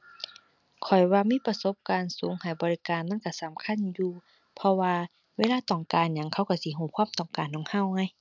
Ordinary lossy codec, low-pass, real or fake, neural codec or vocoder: none; 7.2 kHz; real; none